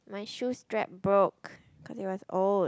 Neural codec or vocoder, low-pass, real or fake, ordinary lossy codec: none; none; real; none